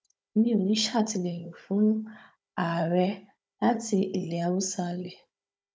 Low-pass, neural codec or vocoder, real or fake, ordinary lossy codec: none; codec, 16 kHz, 16 kbps, FunCodec, trained on Chinese and English, 50 frames a second; fake; none